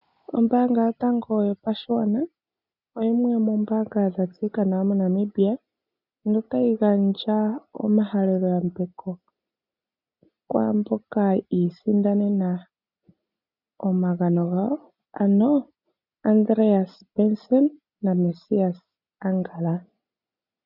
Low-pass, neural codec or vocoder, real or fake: 5.4 kHz; none; real